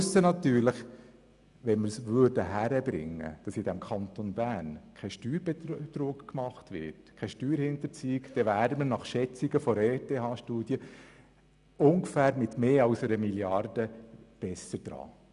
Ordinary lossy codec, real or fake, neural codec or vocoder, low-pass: none; real; none; 10.8 kHz